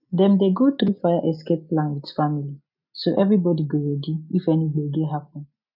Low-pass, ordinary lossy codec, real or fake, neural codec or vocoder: 5.4 kHz; none; real; none